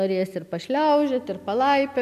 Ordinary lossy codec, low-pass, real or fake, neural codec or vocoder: AAC, 96 kbps; 14.4 kHz; real; none